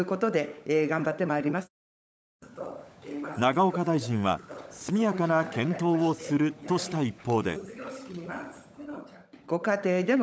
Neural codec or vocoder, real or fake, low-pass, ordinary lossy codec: codec, 16 kHz, 16 kbps, FunCodec, trained on LibriTTS, 50 frames a second; fake; none; none